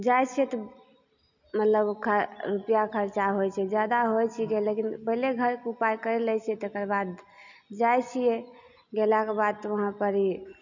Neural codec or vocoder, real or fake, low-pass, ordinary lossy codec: none; real; 7.2 kHz; none